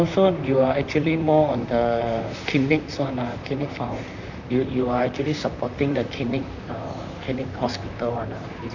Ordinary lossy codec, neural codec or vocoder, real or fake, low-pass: none; vocoder, 44.1 kHz, 128 mel bands, Pupu-Vocoder; fake; 7.2 kHz